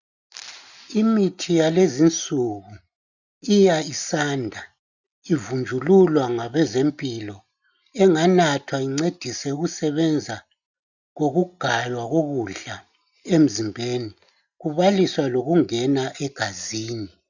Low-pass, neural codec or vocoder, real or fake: 7.2 kHz; none; real